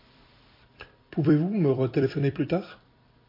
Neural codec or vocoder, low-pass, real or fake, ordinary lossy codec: vocoder, 44.1 kHz, 128 mel bands every 256 samples, BigVGAN v2; 5.4 kHz; fake; MP3, 32 kbps